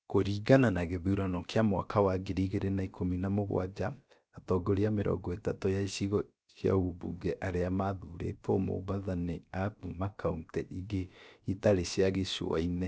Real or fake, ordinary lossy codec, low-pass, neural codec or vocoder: fake; none; none; codec, 16 kHz, about 1 kbps, DyCAST, with the encoder's durations